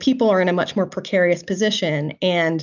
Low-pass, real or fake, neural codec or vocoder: 7.2 kHz; real; none